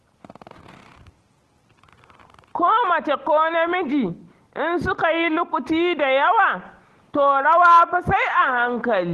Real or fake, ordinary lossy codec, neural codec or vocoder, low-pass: real; Opus, 16 kbps; none; 10.8 kHz